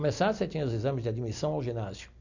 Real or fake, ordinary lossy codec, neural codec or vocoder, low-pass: real; none; none; 7.2 kHz